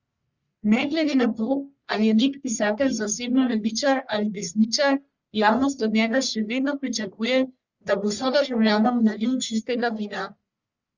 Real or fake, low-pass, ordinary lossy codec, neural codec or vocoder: fake; 7.2 kHz; Opus, 64 kbps; codec, 44.1 kHz, 1.7 kbps, Pupu-Codec